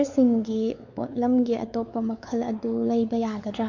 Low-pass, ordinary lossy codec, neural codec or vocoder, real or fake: 7.2 kHz; AAC, 48 kbps; codec, 16 kHz, 4 kbps, X-Codec, WavLM features, trained on Multilingual LibriSpeech; fake